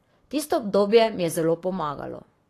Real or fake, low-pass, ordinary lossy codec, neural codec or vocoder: fake; 14.4 kHz; AAC, 48 kbps; codec, 44.1 kHz, 7.8 kbps, Pupu-Codec